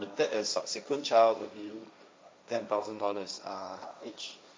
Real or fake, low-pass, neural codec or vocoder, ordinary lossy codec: fake; none; codec, 16 kHz, 1.1 kbps, Voila-Tokenizer; none